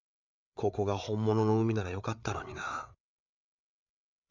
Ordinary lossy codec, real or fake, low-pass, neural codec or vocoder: none; fake; 7.2 kHz; codec, 16 kHz, 8 kbps, FreqCodec, larger model